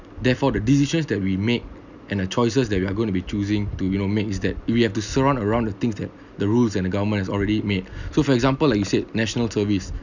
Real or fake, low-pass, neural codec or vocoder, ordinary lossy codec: real; 7.2 kHz; none; none